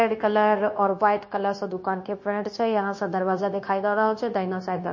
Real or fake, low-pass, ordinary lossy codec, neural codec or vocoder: fake; 7.2 kHz; MP3, 32 kbps; codec, 16 kHz, 0.9 kbps, LongCat-Audio-Codec